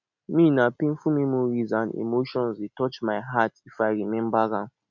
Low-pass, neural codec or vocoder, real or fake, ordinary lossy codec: 7.2 kHz; none; real; none